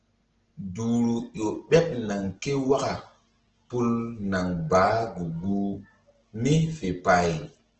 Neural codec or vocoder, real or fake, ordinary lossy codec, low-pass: none; real; Opus, 16 kbps; 7.2 kHz